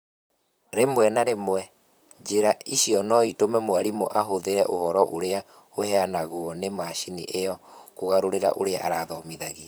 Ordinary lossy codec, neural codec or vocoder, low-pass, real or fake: none; vocoder, 44.1 kHz, 128 mel bands, Pupu-Vocoder; none; fake